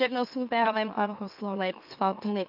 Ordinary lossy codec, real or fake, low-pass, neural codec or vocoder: AAC, 48 kbps; fake; 5.4 kHz; autoencoder, 44.1 kHz, a latent of 192 numbers a frame, MeloTTS